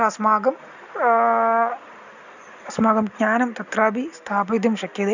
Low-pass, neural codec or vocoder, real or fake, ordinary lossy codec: 7.2 kHz; none; real; none